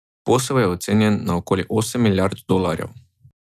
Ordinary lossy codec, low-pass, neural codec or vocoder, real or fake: none; 14.4 kHz; none; real